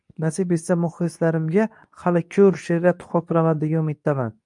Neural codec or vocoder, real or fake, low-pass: codec, 24 kHz, 0.9 kbps, WavTokenizer, medium speech release version 2; fake; 10.8 kHz